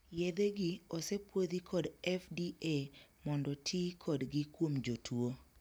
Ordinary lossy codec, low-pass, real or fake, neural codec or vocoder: none; none; real; none